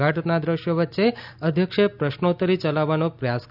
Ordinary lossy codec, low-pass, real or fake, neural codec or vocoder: none; 5.4 kHz; real; none